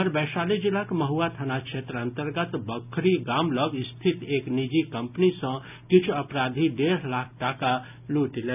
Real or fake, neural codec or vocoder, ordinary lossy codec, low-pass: real; none; none; 3.6 kHz